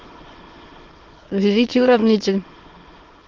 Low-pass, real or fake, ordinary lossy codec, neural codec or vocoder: 7.2 kHz; fake; Opus, 16 kbps; autoencoder, 22.05 kHz, a latent of 192 numbers a frame, VITS, trained on many speakers